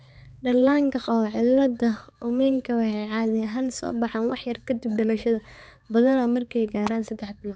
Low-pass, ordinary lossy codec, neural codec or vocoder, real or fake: none; none; codec, 16 kHz, 4 kbps, X-Codec, HuBERT features, trained on balanced general audio; fake